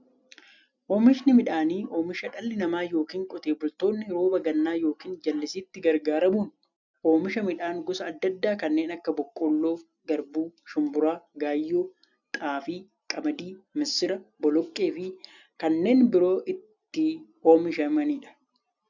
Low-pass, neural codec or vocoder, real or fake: 7.2 kHz; none; real